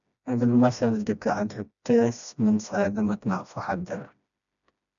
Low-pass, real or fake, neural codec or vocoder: 7.2 kHz; fake; codec, 16 kHz, 1 kbps, FreqCodec, smaller model